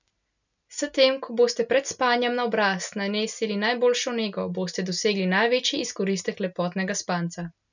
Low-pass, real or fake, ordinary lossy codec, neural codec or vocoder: 7.2 kHz; real; none; none